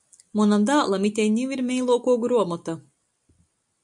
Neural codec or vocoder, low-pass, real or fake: none; 10.8 kHz; real